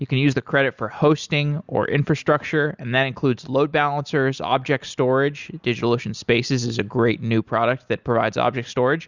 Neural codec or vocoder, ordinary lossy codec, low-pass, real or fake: none; Opus, 64 kbps; 7.2 kHz; real